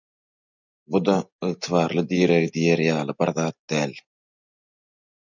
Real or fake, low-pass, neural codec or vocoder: real; 7.2 kHz; none